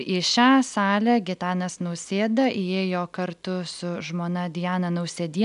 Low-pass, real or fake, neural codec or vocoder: 10.8 kHz; real; none